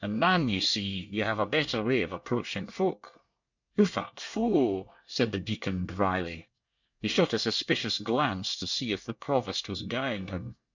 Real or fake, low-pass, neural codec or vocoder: fake; 7.2 kHz; codec, 24 kHz, 1 kbps, SNAC